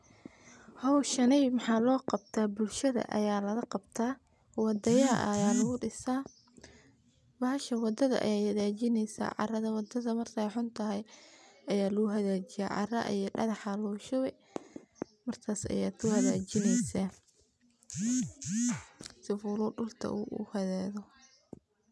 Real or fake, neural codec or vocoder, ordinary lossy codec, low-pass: real; none; none; none